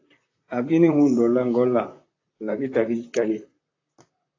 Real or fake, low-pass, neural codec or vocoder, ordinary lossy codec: fake; 7.2 kHz; vocoder, 44.1 kHz, 128 mel bands, Pupu-Vocoder; AAC, 32 kbps